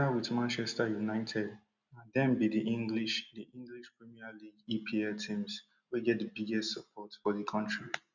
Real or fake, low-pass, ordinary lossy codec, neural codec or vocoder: real; 7.2 kHz; none; none